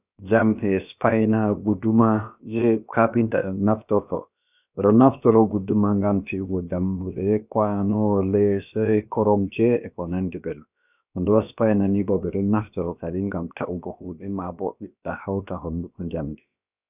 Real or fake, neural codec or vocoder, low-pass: fake; codec, 16 kHz, about 1 kbps, DyCAST, with the encoder's durations; 3.6 kHz